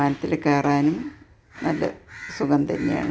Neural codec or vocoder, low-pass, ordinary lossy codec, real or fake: none; none; none; real